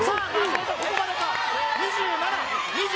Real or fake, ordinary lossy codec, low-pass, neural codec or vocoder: real; none; none; none